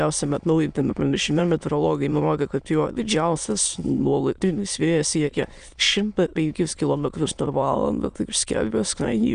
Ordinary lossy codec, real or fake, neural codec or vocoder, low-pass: Opus, 64 kbps; fake; autoencoder, 22.05 kHz, a latent of 192 numbers a frame, VITS, trained on many speakers; 9.9 kHz